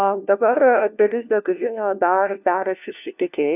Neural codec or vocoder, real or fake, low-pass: codec, 16 kHz, 1 kbps, FunCodec, trained on LibriTTS, 50 frames a second; fake; 3.6 kHz